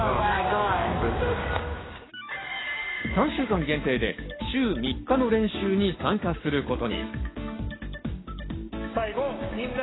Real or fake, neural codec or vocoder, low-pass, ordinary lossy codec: fake; codec, 16 kHz, 6 kbps, DAC; 7.2 kHz; AAC, 16 kbps